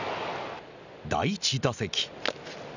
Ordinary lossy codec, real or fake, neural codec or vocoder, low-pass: none; real; none; 7.2 kHz